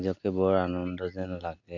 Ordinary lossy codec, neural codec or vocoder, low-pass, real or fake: MP3, 64 kbps; none; 7.2 kHz; real